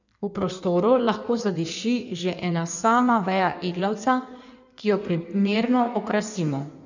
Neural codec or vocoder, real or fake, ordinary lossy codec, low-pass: codec, 16 kHz in and 24 kHz out, 1.1 kbps, FireRedTTS-2 codec; fake; none; 7.2 kHz